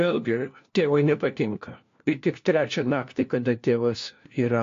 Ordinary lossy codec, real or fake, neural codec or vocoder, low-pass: AAC, 48 kbps; fake; codec, 16 kHz, 1 kbps, FunCodec, trained on LibriTTS, 50 frames a second; 7.2 kHz